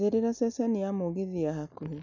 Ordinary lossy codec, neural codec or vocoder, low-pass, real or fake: none; none; 7.2 kHz; real